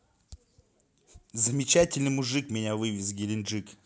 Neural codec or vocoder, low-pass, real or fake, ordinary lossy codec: none; none; real; none